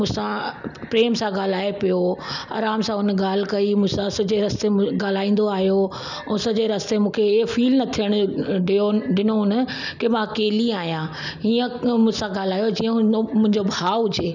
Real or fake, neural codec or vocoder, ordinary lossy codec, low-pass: real; none; none; 7.2 kHz